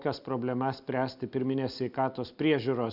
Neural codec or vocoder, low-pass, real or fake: none; 5.4 kHz; real